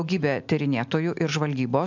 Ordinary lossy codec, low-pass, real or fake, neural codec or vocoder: MP3, 64 kbps; 7.2 kHz; real; none